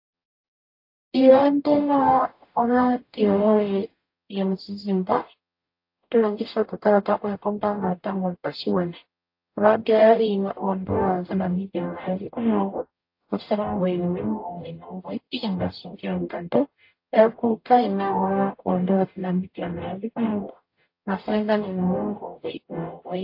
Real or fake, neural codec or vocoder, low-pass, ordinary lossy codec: fake; codec, 44.1 kHz, 0.9 kbps, DAC; 5.4 kHz; AAC, 32 kbps